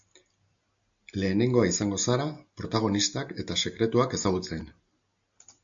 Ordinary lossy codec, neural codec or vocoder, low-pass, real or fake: AAC, 64 kbps; none; 7.2 kHz; real